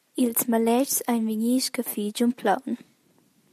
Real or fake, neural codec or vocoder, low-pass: real; none; 14.4 kHz